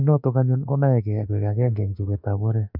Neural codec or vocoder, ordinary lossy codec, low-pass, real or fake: autoencoder, 48 kHz, 32 numbers a frame, DAC-VAE, trained on Japanese speech; none; 5.4 kHz; fake